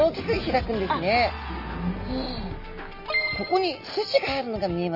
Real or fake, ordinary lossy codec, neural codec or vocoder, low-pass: real; none; none; 5.4 kHz